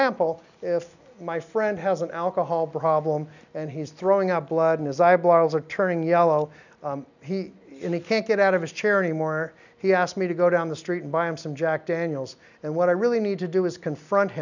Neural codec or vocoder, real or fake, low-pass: none; real; 7.2 kHz